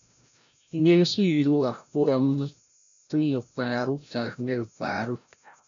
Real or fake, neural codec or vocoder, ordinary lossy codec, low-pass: fake; codec, 16 kHz, 0.5 kbps, FreqCodec, larger model; MP3, 64 kbps; 7.2 kHz